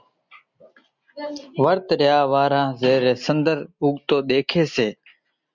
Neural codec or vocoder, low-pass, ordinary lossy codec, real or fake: none; 7.2 kHz; MP3, 64 kbps; real